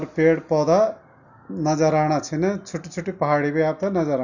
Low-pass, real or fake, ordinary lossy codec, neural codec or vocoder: 7.2 kHz; real; none; none